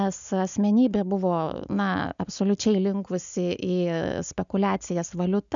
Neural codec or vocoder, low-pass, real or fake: none; 7.2 kHz; real